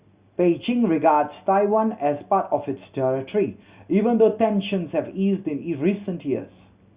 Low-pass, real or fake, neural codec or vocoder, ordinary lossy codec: 3.6 kHz; real; none; Opus, 64 kbps